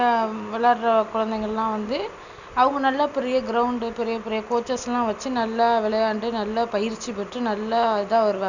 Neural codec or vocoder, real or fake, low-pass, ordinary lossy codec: none; real; 7.2 kHz; none